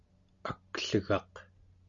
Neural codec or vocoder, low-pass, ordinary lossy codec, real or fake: none; 7.2 kHz; Opus, 64 kbps; real